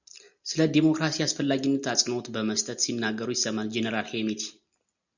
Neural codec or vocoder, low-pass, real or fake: none; 7.2 kHz; real